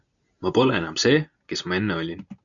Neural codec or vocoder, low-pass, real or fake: none; 7.2 kHz; real